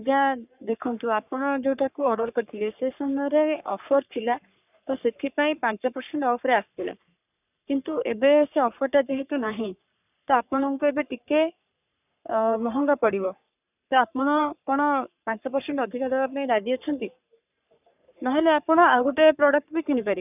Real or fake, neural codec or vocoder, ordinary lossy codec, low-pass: fake; codec, 44.1 kHz, 3.4 kbps, Pupu-Codec; none; 3.6 kHz